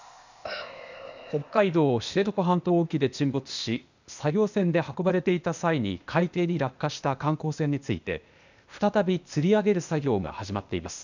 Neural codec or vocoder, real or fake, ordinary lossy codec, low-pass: codec, 16 kHz, 0.8 kbps, ZipCodec; fake; none; 7.2 kHz